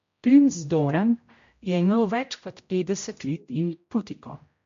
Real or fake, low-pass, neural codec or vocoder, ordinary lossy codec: fake; 7.2 kHz; codec, 16 kHz, 0.5 kbps, X-Codec, HuBERT features, trained on general audio; MP3, 48 kbps